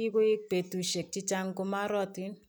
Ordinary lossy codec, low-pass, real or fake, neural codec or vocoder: none; none; real; none